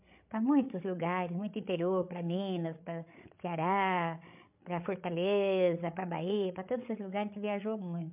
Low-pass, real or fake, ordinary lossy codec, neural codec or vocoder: 3.6 kHz; fake; MP3, 32 kbps; codec, 16 kHz, 8 kbps, FreqCodec, larger model